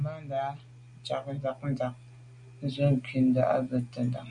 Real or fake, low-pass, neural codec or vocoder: real; 9.9 kHz; none